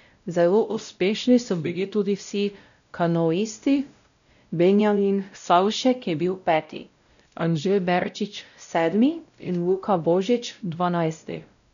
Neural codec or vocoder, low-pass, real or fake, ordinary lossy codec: codec, 16 kHz, 0.5 kbps, X-Codec, WavLM features, trained on Multilingual LibriSpeech; 7.2 kHz; fake; none